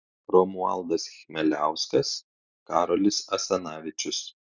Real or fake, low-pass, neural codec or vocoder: real; 7.2 kHz; none